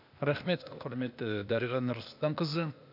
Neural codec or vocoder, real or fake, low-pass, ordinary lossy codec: codec, 16 kHz, 0.8 kbps, ZipCodec; fake; 5.4 kHz; none